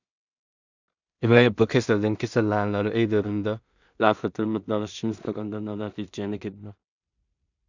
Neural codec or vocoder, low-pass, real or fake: codec, 16 kHz in and 24 kHz out, 0.4 kbps, LongCat-Audio-Codec, two codebook decoder; 7.2 kHz; fake